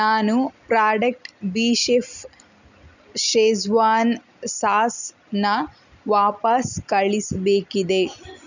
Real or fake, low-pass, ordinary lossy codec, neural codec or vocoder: real; 7.2 kHz; none; none